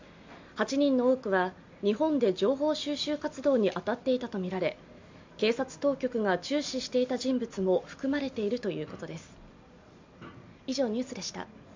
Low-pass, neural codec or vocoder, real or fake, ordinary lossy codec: 7.2 kHz; none; real; MP3, 64 kbps